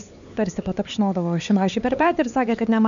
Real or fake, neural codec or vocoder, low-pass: fake; codec, 16 kHz, 4 kbps, X-Codec, HuBERT features, trained on LibriSpeech; 7.2 kHz